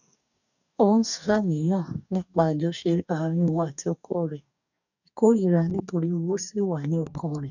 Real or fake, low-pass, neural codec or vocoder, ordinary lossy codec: fake; 7.2 kHz; codec, 44.1 kHz, 2.6 kbps, DAC; none